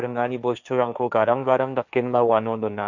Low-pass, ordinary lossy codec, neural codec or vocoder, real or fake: 7.2 kHz; none; codec, 16 kHz, 1.1 kbps, Voila-Tokenizer; fake